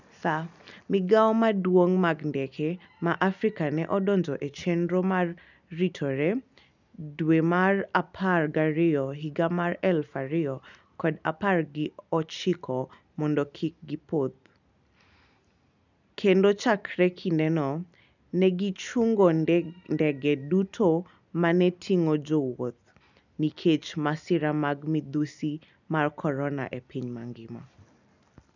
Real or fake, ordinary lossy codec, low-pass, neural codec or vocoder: real; none; 7.2 kHz; none